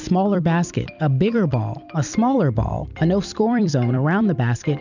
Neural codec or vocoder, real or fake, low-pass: vocoder, 44.1 kHz, 128 mel bands every 256 samples, BigVGAN v2; fake; 7.2 kHz